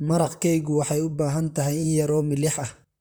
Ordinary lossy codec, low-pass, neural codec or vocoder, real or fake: none; none; vocoder, 44.1 kHz, 128 mel bands, Pupu-Vocoder; fake